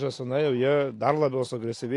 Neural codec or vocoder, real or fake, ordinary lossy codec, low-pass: none; real; AAC, 48 kbps; 10.8 kHz